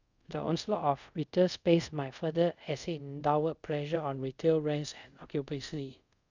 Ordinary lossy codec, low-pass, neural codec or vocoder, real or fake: none; 7.2 kHz; codec, 24 kHz, 0.5 kbps, DualCodec; fake